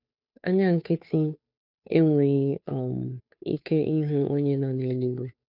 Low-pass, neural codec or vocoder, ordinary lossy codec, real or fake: 5.4 kHz; codec, 16 kHz, 2 kbps, FunCodec, trained on Chinese and English, 25 frames a second; none; fake